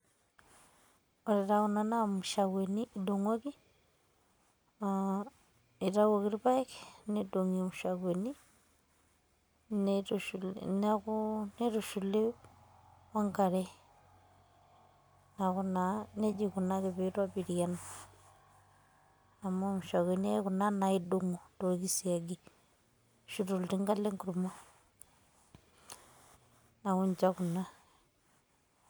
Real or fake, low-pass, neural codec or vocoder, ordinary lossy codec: real; none; none; none